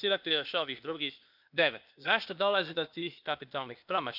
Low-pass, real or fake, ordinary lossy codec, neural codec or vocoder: 5.4 kHz; fake; none; codec, 16 kHz, 0.8 kbps, ZipCodec